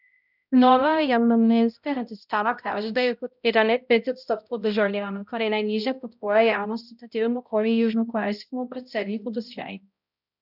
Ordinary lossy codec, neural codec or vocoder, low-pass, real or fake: none; codec, 16 kHz, 0.5 kbps, X-Codec, HuBERT features, trained on balanced general audio; 5.4 kHz; fake